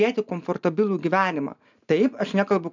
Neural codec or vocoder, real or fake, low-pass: none; real; 7.2 kHz